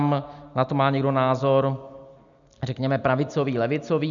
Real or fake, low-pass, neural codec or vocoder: real; 7.2 kHz; none